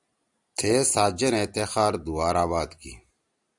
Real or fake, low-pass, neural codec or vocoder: real; 10.8 kHz; none